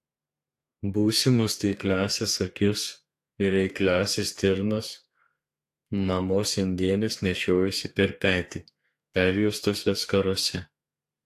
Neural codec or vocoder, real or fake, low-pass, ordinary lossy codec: codec, 32 kHz, 1.9 kbps, SNAC; fake; 14.4 kHz; AAC, 64 kbps